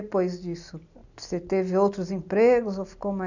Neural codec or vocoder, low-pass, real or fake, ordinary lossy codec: none; 7.2 kHz; real; none